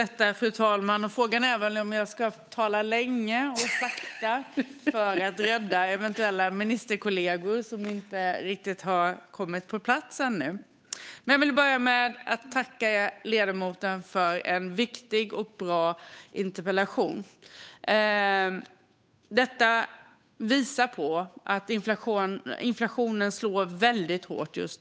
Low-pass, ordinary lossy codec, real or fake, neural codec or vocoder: none; none; fake; codec, 16 kHz, 8 kbps, FunCodec, trained on Chinese and English, 25 frames a second